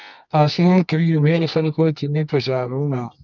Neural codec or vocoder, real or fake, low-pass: codec, 24 kHz, 0.9 kbps, WavTokenizer, medium music audio release; fake; 7.2 kHz